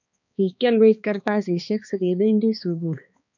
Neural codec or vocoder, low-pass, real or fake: codec, 16 kHz, 2 kbps, X-Codec, HuBERT features, trained on balanced general audio; 7.2 kHz; fake